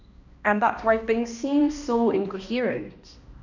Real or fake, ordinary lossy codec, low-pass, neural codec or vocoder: fake; none; 7.2 kHz; codec, 16 kHz, 1 kbps, X-Codec, HuBERT features, trained on balanced general audio